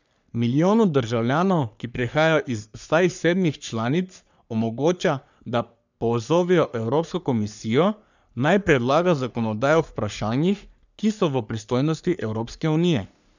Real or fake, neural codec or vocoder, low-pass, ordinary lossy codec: fake; codec, 44.1 kHz, 3.4 kbps, Pupu-Codec; 7.2 kHz; none